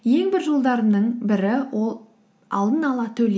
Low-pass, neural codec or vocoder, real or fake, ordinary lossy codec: none; none; real; none